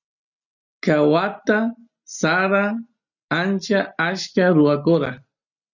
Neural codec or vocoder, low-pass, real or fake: none; 7.2 kHz; real